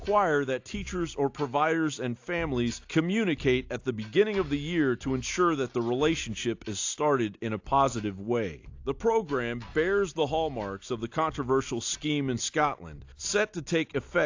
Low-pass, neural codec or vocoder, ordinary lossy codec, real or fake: 7.2 kHz; none; AAC, 48 kbps; real